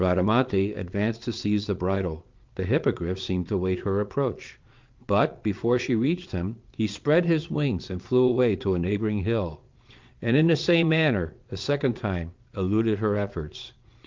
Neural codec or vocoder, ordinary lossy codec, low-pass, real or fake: vocoder, 22.05 kHz, 80 mel bands, Vocos; Opus, 24 kbps; 7.2 kHz; fake